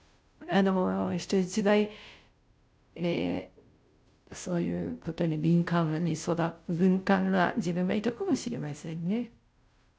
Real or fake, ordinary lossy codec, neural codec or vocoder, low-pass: fake; none; codec, 16 kHz, 0.5 kbps, FunCodec, trained on Chinese and English, 25 frames a second; none